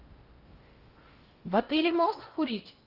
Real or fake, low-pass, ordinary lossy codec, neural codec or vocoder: fake; 5.4 kHz; Opus, 24 kbps; codec, 16 kHz in and 24 kHz out, 0.6 kbps, FocalCodec, streaming, 4096 codes